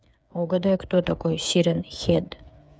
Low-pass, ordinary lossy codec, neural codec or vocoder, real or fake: none; none; codec, 16 kHz, 8 kbps, FreqCodec, smaller model; fake